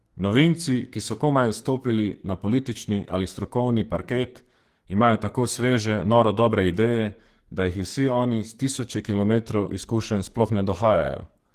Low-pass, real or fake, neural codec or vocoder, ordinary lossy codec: 14.4 kHz; fake; codec, 44.1 kHz, 2.6 kbps, SNAC; Opus, 24 kbps